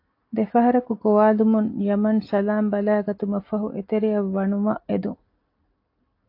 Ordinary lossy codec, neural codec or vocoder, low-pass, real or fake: AAC, 32 kbps; none; 5.4 kHz; real